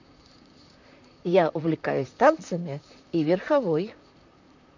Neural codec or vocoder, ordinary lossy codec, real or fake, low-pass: vocoder, 44.1 kHz, 128 mel bands, Pupu-Vocoder; none; fake; 7.2 kHz